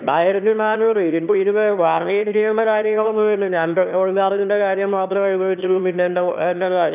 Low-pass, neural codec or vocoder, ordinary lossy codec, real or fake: 3.6 kHz; autoencoder, 22.05 kHz, a latent of 192 numbers a frame, VITS, trained on one speaker; none; fake